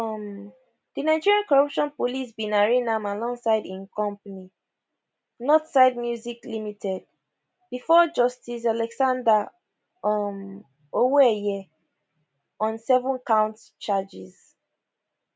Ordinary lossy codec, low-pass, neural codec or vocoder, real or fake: none; none; none; real